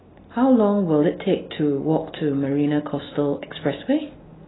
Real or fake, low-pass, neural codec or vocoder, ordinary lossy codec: real; 7.2 kHz; none; AAC, 16 kbps